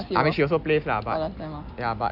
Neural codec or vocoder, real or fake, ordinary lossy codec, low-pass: none; real; none; 5.4 kHz